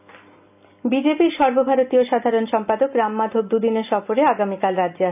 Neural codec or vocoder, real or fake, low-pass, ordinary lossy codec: none; real; 3.6 kHz; none